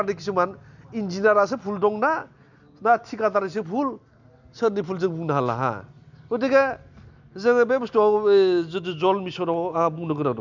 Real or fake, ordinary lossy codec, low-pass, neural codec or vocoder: real; none; 7.2 kHz; none